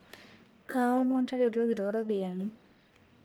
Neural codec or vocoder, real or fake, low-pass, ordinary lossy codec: codec, 44.1 kHz, 1.7 kbps, Pupu-Codec; fake; none; none